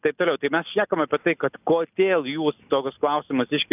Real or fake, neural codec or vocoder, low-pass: real; none; 3.6 kHz